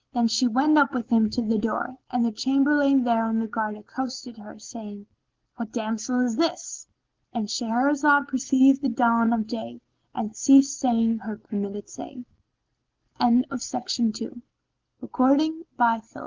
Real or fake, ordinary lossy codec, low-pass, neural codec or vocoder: real; Opus, 16 kbps; 7.2 kHz; none